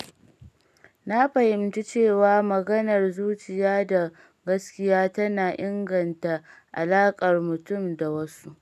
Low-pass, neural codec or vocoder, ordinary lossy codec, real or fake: 14.4 kHz; none; none; real